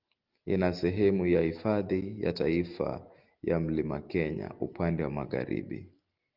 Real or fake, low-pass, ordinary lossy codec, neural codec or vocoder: real; 5.4 kHz; Opus, 24 kbps; none